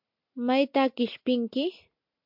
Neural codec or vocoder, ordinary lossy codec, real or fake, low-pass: none; MP3, 48 kbps; real; 5.4 kHz